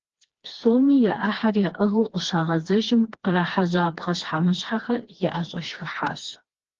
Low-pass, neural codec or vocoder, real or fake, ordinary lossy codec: 7.2 kHz; codec, 16 kHz, 2 kbps, FreqCodec, smaller model; fake; Opus, 16 kbps